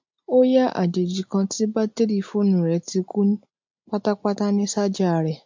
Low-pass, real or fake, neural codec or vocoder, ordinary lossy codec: 7.2 kHz; real; none; MP3, 48 kbps